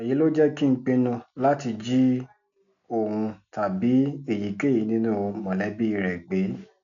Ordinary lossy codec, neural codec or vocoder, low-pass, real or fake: none; none; 7.2 kHz; real